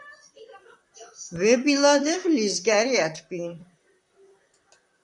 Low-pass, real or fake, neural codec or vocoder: 10.8 kHz; fake; vocoder, 44.1 kHz, 128 mel bands, Pupu-Vocoder